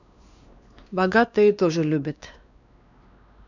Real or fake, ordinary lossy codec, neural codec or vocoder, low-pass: fake; none; codec, 16 kHz, 2 kbps, X-Codec, WavLM features, trained on Multilingual LibriSpeech; 7.2 kHz